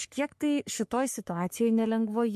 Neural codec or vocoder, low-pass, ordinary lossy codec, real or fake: codec, 44.1 kHz, 3.4 kbps, Pupu-Codec; 14.4 kHz; MP3, 64 kbps; fake